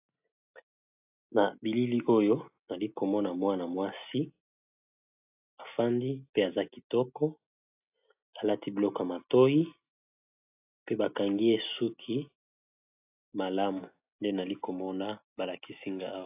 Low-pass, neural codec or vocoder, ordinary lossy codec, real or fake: 3.6 kHz; none; AAC, 32 kbps; real